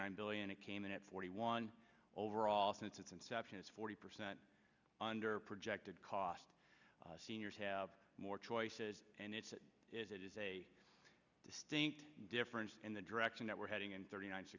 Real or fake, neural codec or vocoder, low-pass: real; none; 7.2 kHz